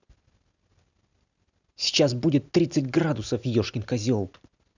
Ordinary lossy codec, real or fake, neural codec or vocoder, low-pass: none; real; none; 7.2 kHz